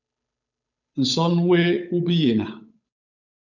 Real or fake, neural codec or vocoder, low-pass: fake; codec, 16 kHz, 8 kbps, FunCodec, trained on Chinese and English, 25 frames a second; 7.2 kHz